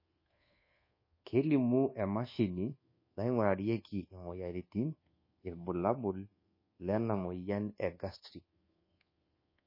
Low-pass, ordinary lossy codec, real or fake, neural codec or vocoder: 5.4 kHz; MP3, 24 kbps; fake; codec, 24 kHz, 1.2 kbps, DualCodec